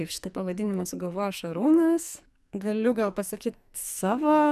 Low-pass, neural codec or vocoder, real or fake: 14.4 kHz; codec, 44.1 kHz, 2.6 kbps, SNAC; fake